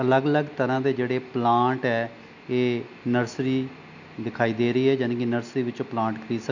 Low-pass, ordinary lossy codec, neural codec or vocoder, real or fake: 7.2 kHz; none; none; real